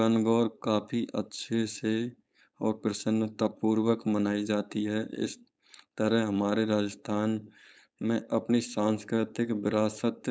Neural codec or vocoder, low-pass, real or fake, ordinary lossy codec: codec, 16 kHz, 4.8 kbps, FACodec; none; fake; none